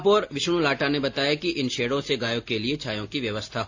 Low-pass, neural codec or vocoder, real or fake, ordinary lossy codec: 7.2 kHz; none; real; AAC, 48 kbps